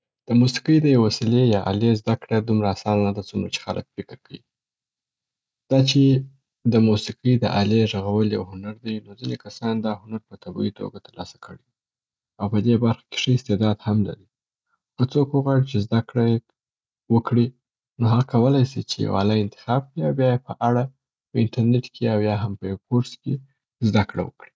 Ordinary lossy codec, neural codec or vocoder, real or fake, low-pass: none; none; real; none